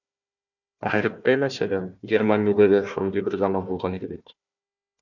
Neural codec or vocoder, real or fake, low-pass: codec, 16 kHz, 1 kbps, FunCodec, trained on Chinese and English, 50 frames a second; fake; 7.2 kHz